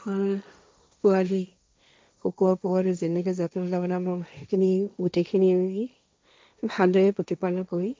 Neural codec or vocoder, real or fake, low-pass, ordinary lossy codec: codec, 16 kHz, 1.1 kbps, Voila-Tokenizer; fake; none; none